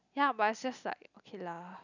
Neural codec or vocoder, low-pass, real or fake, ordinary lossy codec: none; 7.2 kHz; real; none